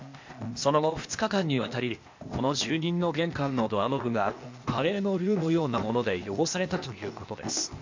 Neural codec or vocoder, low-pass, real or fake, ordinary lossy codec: codec, 16 kHz, 0.8 kbps, ZipCodec; 7.2 kHz; fake; MP3, 48 kbps